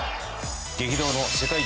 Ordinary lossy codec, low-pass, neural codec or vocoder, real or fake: none; none; none; real